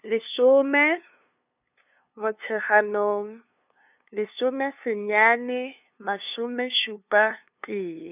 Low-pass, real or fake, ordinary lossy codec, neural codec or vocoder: 3.6 kHz; fake; none; codec, 16 kHz, 2 kbps, FunCodec, trained on LibriTTS, 25 frames a second